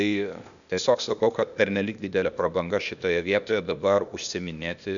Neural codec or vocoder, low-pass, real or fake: codec, 16 kHz, 0.8 kbps, ZipCodec; 7.2 kHz; fake